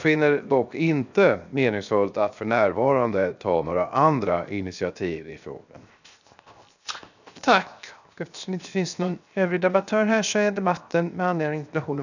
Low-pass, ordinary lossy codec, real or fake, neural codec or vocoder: 7.2 kHz; none; fake; codec, 16 kHz, 0.7 kbps, FocalCodec